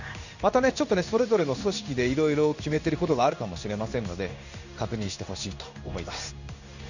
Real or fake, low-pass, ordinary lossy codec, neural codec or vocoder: fake; 7.2 kHz; none; codec, 16 kHz in and 24 kHz out, 1 kbps, XY-Tokenizer